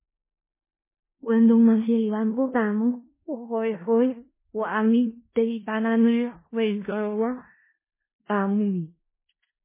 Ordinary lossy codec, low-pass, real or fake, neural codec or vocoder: MP3, 16 kbps; 3.6 kHz; fake; codec, 16 kHz in and 24 kHz out, 0.4 kbps, LongCat-Audio-Codec, four codebook decoder